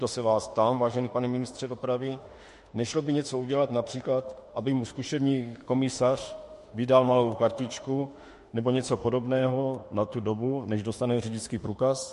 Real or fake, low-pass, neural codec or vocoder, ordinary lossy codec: fake; 14.4 kHz; autoencoder, 48 kHz, 32 numbers a frame, DAC-VAE, trained on Japanese speech; MP3, 48 kbps